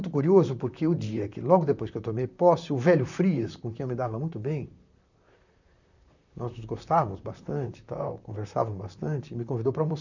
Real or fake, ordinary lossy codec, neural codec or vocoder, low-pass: fake; none; vocoder, 44.1 kHz, 128 mel bands, Pupu-Vocoder; 7.2 kHz